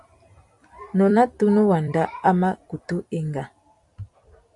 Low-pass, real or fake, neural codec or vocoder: 10.8 kHz; fake; vocoder, 44.1 kHz, 128 mel bands every 256 samples, BigVGAN v2